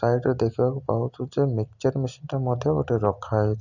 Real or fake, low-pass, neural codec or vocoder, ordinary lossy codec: real; 7.2 kHz; none; none